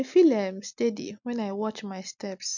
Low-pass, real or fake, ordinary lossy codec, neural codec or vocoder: 7.2 kHz; real; none; none